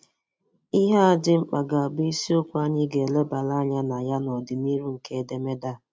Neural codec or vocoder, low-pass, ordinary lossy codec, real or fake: none; none; none; real